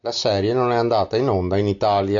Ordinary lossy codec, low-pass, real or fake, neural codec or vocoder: AAC, 64 kbps; 7.2 kHz; real; none